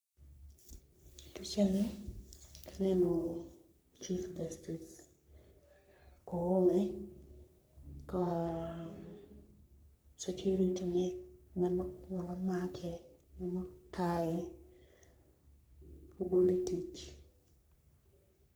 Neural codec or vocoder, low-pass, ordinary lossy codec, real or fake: codec, 44.1 kHz, 3.4 kbps, Pupu-Codec; none; none; fake